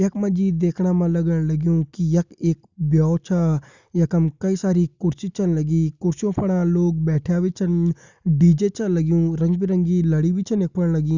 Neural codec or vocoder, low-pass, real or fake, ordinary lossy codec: none; 7.2 kHz; real; Opus, 64 kbps